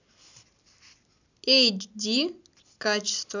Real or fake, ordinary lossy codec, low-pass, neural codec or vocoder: real; none; 7.2 kHz; none